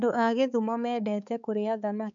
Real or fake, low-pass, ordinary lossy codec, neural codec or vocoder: fake; 7.2 kHz; none; codec, 16 kHz, 4 kbps, X-Codec, HuBERT features, trained on balanced general audio